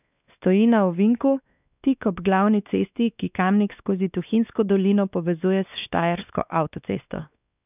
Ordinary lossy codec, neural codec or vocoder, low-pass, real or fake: none; codec, 16 kHz, 2 kbps, X-Codec, WavLM features, trained on Multilingual LibriSpeech; 3.6 kHz; fake